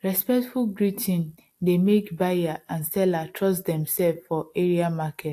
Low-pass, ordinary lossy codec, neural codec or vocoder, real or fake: 14.4 kHz; AAC, 64 kbps; none; real